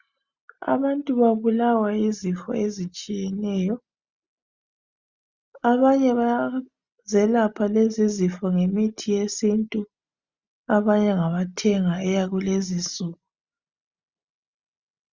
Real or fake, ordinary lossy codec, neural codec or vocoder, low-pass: real; Opus, 64 kbps; none; 7.2 kHz